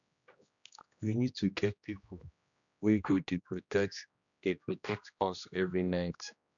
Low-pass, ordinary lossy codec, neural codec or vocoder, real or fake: 7.2 kHz; none; codec, 16 kHz, 1 kbps, X-Codec, HuBERT features, trained on general audio; fake